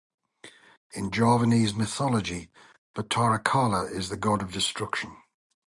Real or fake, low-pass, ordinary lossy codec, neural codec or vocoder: real; 10.8 kHz; Opus, 64 kbps; none